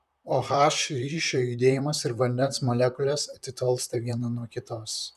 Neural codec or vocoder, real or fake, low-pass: vocoder, 44.1 kHz, 128 mel bands, Pupu-Vocoder; fake; 14.4 kHz